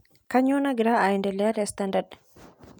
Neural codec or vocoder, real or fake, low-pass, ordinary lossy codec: none; real; none; none